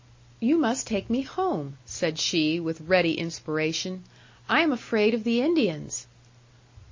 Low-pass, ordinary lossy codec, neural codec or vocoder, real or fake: 7.2 kHz; MP3, 32 kbps; none; real